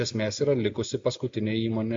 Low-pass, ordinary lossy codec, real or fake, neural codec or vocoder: 7.2 kHz; MP3, 48 kbps; real; none